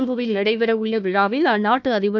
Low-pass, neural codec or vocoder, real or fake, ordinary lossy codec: 7.2 kHz; codec, 16 kHz, 1 kbps, FunCodec, trained on Chinese and English, 50 frames a second; fake; none